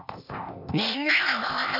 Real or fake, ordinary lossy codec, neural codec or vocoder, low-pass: fake; none; codec, 16 kHz, 0.8 kbps, ZipCodec; 5.4 kHz